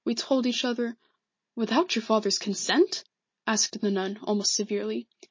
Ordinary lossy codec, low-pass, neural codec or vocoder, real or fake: MP3, 32 kbps; 7.2 kHz; none; real